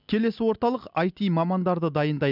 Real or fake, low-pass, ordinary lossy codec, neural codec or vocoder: real; 5.4 kHz; none; none